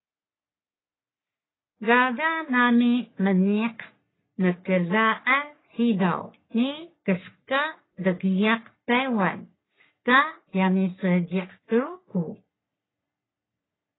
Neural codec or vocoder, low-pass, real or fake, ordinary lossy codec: codec, 44.1 kHz, 3.4 kbps, Pupu-Codec; 7.2 kHz; fake; AAC, 16 kbps